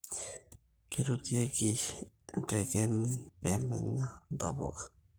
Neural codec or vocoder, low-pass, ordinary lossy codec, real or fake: codec, 44.1 kHz, 3.4 kbps, Pupu-Codec; none; none; fake